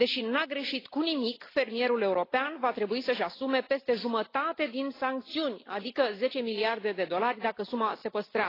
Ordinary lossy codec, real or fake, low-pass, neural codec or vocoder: AAC, 24 kbps; real; 5.4 kHz; none